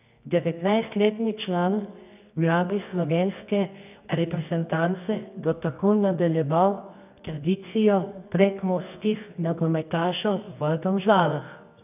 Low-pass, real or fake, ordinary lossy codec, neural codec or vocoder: 3.6 kHz; fake; none; codec, 24 kHz, 0.9 kbps, WavTokenizer, medium music audio release